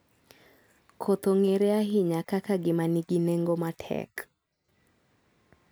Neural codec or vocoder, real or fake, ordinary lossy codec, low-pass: none; real; none; none